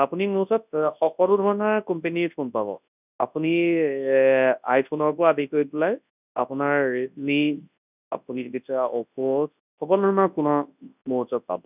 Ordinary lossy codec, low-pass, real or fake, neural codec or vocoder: none; 3.6 kHz; fake; codec, 24 kHz, 0.9 kbps, WavTokenizer, large speech release